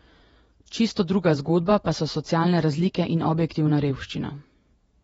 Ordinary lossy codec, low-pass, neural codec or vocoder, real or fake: AAC, 24 kbps; 19.8 kHz; vocoder, 48 kHz, 128 mel bands, Vocos; fake